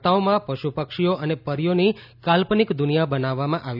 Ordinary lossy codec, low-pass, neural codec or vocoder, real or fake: none; 5.4 kHz; none; real